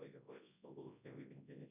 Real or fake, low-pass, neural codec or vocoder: fake; 3.6 kHz; codec, 24 kHz, 0.9 kbps, WavTokenizer, large speech release